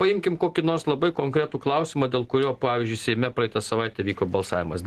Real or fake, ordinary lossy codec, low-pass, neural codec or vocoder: real; Opus, 16 kbps; 14.4 kHz; none